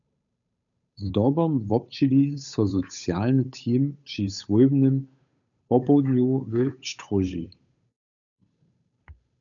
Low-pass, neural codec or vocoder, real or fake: 7.2 kHz; codec, 16 kHz, 8 kbps, FunCodec, trained on Chinese and English, 25 frames a second; fake